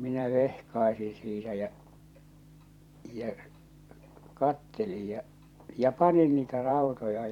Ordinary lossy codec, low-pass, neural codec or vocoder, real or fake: none; 19.8 kHz; vocoder, 44.1 kHz, 128 mel bands every 512 samples, BigVGAN v2; fake